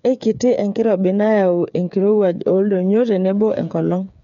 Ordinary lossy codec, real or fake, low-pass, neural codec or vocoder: none; fake; 7.2 kHz; codec, 16 kHz, 8 kbps, FreqCodec, smaller model